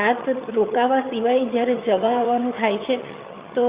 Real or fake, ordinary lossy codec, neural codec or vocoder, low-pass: fake; Opus, 64 kbps; vocoder, 22.05 kHz, 80 mel bands, HiFi-GAN; 3.6 kHz